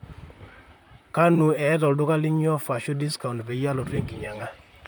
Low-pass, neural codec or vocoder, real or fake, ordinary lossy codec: none; vocoder, 44.1 kHz, 128 mel bands, Pupu-Vocoder; fake; none